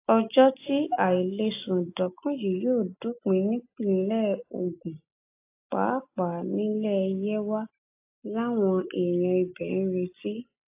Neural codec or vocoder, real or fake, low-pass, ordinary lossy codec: none; real; 3.6 kHz; AAC, 24 kbps